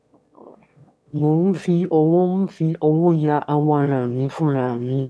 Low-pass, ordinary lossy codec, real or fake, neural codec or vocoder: none; none; fake; autoencoder, 22.05 kHz, a latent of 192 numbers a frame, VITS, trained on one speaker